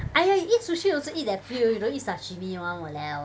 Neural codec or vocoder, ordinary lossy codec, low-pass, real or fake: none; none; none; real